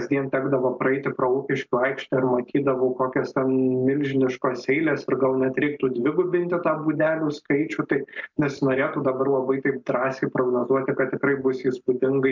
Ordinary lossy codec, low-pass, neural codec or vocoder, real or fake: MP3, 64 kbps; 7.2 kHz; none; real